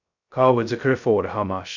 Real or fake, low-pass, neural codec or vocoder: fake; 7.2 kHz; codec, 16 kHz, 0.2 kbps, FocalCodec